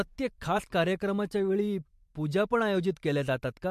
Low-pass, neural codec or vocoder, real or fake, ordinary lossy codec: 14.4 kHz; vocoder, 44.1 kHz, 128 mel bands every 512 samples, BigVGAN v2; fake; MP3, 64 kbps